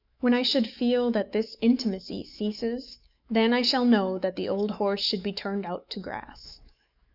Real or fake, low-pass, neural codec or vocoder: fake; 5.4 kHz; autoencoder, 48 kHz, 128 numbers a frame, DAC-VAE, trained on Japanese speech